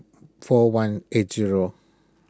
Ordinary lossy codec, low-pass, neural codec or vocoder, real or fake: none; none; none; real